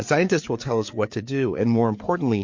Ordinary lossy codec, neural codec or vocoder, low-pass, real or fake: MP3, 48 kbps; codec, 16 kHz, 4 kbps, FreqCodec, larger model; 7.2 kHz; fake